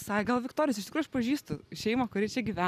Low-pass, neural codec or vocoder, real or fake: 14.4 kHz; none; real